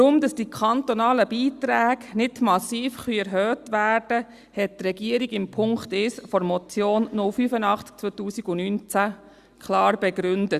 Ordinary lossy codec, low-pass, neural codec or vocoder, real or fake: Opus, 64 kbps; 14.4 kHz; none; real